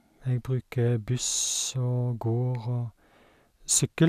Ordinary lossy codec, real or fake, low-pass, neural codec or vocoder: none; real; 14.4 kHz; none